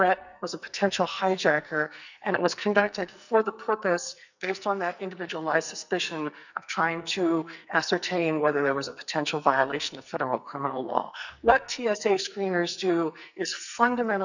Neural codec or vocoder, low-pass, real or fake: codec, 44.1 kHz, 2.6 kbps, SNAC; 7.2 kHz; fake